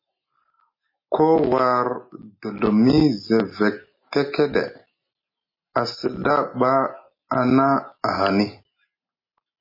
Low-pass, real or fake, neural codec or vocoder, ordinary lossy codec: 5.4 kHz; real; none; MP3, 24 kbps